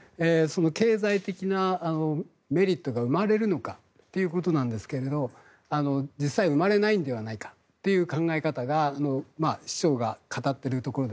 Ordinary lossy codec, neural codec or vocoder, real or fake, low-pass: none; none; real; none